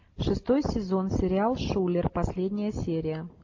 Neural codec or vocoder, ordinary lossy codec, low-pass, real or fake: none; AAC, 48 kbps; 7.2 kHz; real